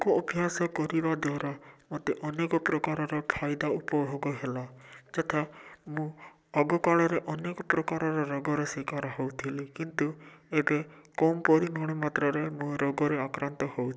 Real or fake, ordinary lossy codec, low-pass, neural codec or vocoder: real; none; none; none